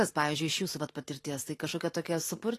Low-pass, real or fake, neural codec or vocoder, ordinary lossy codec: 14.4 kHz; real; none; AAC, 48 kbps